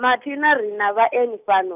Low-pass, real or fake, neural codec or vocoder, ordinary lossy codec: 3.6 kHz; real; none; none